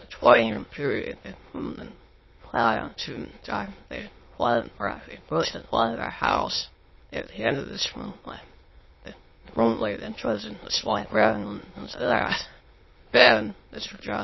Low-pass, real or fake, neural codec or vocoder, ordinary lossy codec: 7.2 kHz; fake; autoencoder, 22.05 kHz, a latent of 192 numbers a frame, VITS, trained on many speakers; MP3, 24 kbps